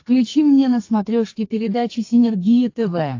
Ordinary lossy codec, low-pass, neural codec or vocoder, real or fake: AAC, 48 kbps; 7.2 kHz; codec, 32 kHz, 1.9 kbps, SNAC; fake